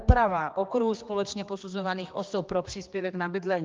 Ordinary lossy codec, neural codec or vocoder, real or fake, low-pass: Opus, 24 kbps; codec, 16 kHz, 2 kbps, X-Codec, HuBERT features, trained on general audio; fake; 7.2 kHz